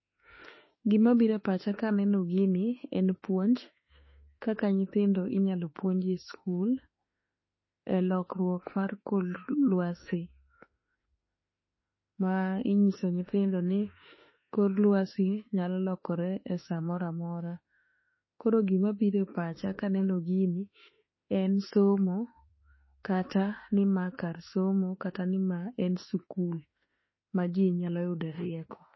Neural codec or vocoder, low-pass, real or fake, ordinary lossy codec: autoencoder, 48 kHz, 32 numbers a frame, DAC-VAE, trained on Japanese speech; 7.2 kHz; fake; MP3, 24 kbps